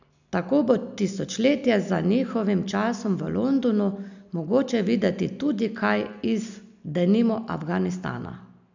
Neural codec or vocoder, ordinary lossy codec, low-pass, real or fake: none; none; 7.2 kHz; real